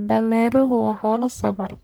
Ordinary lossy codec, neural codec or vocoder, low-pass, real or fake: none; codec, 44.1 kHz, 1.7 kbps, Pupu-Codec; none; fake